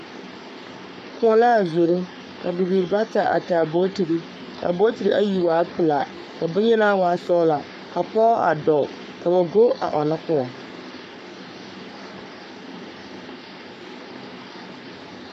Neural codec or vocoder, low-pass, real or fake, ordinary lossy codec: codec, 44.1 kHz, 3.4 kbps, Pupu-Codec; 14.4 kHz; fake; MP3, 96 kbps